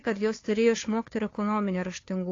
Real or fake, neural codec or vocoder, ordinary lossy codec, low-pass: fake; codec, 16 kHz, 2 kbps, FunCodec, trained on Chinese and English, 25 frames a second; AAC, 32 kbps; 7.2 kHz